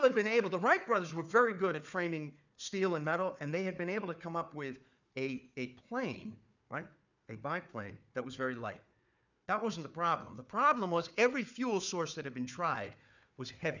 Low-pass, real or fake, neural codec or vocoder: 7.2 kHz; fake; codec, 16 kHz, 4 kbps, FunCodec, trained on Chinese and English, 50 frames a second